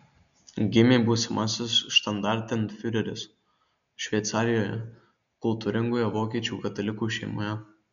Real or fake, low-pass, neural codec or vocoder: real; 7.2 kHz; none